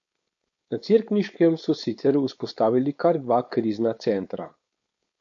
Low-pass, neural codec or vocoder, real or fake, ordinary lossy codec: 7.2 kHz; codec, 16 kHz, 4.8 kbps, FACodec; fake; MP3, 48 kbps